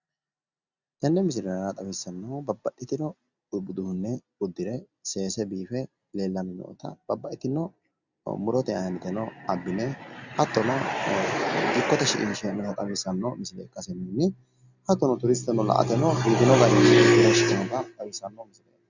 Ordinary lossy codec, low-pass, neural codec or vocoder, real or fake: Opus, 64 kbps; 7.2 kHz; none; real